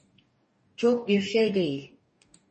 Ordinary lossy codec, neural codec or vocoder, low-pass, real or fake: MP3, 32 kbps; codec, 44.1 kHz, 2.6 kbps, DAC; 10.8 kHz; fake